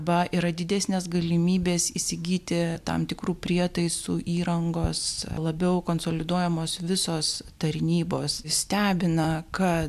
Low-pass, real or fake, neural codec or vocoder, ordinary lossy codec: 14.4 kHz; real; none; AAC, 96 kbps